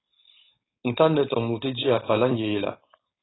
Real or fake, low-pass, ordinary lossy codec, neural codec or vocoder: fake; 7.2 kHz; AAC, 16 kbps; codec, 16 kHz, 4.8 kbps, FACodec